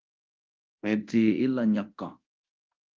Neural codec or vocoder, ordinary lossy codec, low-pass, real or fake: codec, 24 kHz, 0.9 kbps, DualCodec; Opus, 24 kbps; 7.2 kHz; fake